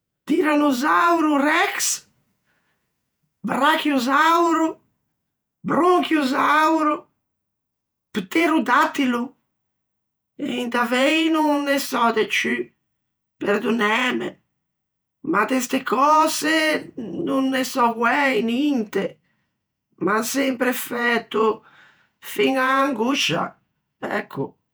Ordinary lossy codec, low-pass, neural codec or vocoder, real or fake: none; none; vocoder, 48 kHz, 128 mel bands, Vocos; fake